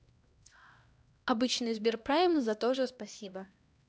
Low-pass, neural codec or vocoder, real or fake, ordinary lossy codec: none; codec, 16 kHz, 1 kbps, X-Codec, HuBERT features, trained on LibriSpeech; fake; none